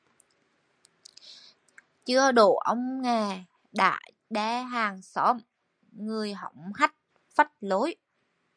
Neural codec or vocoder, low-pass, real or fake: none; 9.9 kHz; real